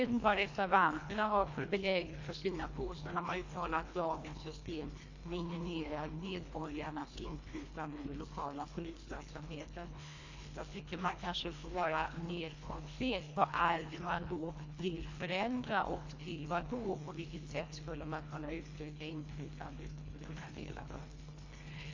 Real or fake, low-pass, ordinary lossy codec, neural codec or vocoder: fake; 7.2 kHz; AAC, 48 kbps; codec, 24 kHz, 1.5 kbps, HILCodec